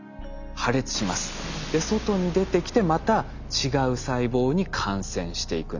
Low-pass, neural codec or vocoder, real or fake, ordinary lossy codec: 7.2 kHz; none; real; none